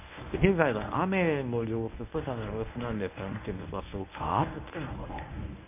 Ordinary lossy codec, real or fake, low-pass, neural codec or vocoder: MP3, 32 kbps; fake; 3.6 kHz; codec, 24 kHz, 0.9 kbps, WavTokenizer, medium speech release version 1